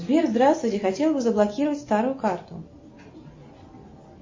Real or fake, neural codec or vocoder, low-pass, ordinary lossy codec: real; none; 7.2 kHz; MP3, 32 kbps